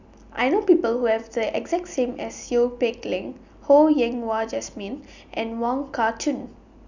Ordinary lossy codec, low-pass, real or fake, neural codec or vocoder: none; 7.2 kHz; real; none